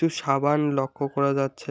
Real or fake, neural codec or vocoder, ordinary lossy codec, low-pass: real; none; none; none